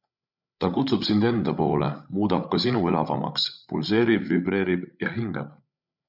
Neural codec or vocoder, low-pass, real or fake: codec, 16 kHz, 16 kbps, FreqCodec, larger model; 5.4 kHz; fake